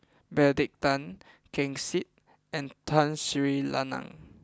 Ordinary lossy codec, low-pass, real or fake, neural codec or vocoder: none; none; real; none